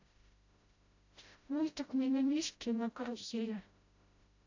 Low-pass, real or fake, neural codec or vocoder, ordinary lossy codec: 7.2 kHz; fake; codec, 16 kHz, 0.5 kbps, FreqCodec, smaller model; AAC, 48 kbps